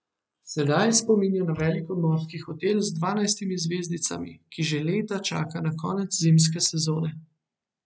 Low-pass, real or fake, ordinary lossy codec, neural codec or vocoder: none; real; none; none